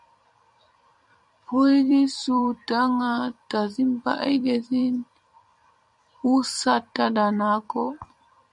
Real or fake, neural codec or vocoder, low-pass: fake; vocoder, 44.1 kHz, 128 mel bands every 256 samples, BigVGAN v2; 10.8 kHz